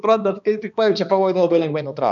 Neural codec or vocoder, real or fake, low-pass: codec, 16 kHz, 2 kbps, X-Codec, HuBERT features, trained on balanced general audio; fake; 7.2 kHz